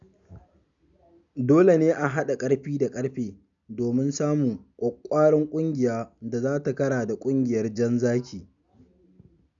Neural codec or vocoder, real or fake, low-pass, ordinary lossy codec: none; real; 7.2 kHz; none